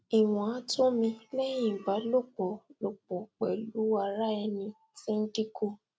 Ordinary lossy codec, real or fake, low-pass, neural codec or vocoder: none; real; none; none